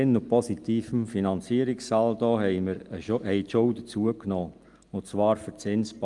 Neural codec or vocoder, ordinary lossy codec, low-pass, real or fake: none; Opus, 32 kbps; 10.8 kHz; real